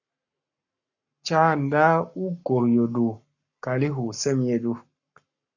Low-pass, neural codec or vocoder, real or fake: 7.2 kHz; codec, 44.1 kHz, 7.8 kbps, Pupu-Codec; fake